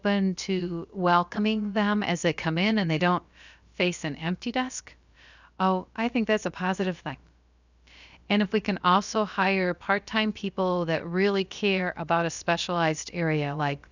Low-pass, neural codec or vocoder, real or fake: 7.2 kHz; codec, 16 kHz, about 1 kbps, DyCAST, with the encoder's durations; fake